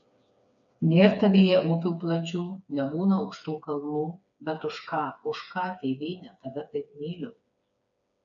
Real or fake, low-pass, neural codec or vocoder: fake; 7.2 kHz; codec, 16 kHz, 4 kbps, FreqCodec, smaller model